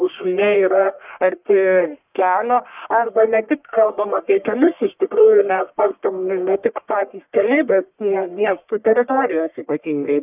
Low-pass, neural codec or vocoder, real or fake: 3.6 kHz; codec, 44.1 kHz, 1.7 kbps, Pupu-Codec; fake